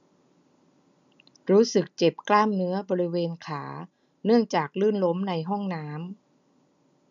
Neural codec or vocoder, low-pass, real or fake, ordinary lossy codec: none; 7.2 kHz; real; none